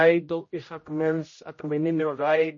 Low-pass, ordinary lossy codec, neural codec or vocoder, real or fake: 7.2 kHz; MP3, 32 kbps; codec, 16 kHz, 0.5 kbps, X-Codec, HuBERT features, trained on general audio; fake